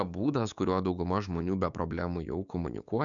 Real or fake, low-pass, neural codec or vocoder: fake; 7.2 kHz; codec, 16 kHz, 6 kbps, DAC